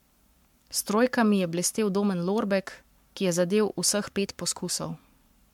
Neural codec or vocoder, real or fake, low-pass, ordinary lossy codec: codec, 44.1 kHz, 7.8 kbps, Pupu-Codec; fake; 19.8 kHz; MP3, 96 kbps